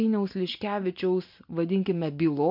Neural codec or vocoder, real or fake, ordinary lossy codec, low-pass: none; real; MP3, 48 kbps; 5.4 kHz